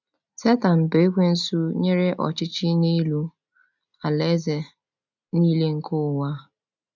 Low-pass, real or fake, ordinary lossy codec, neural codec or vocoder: 7.2 kHz; real; none; none